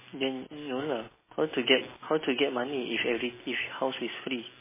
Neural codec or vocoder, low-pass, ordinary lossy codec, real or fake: none; 3.6 kHz; MP3, 16 kbps; real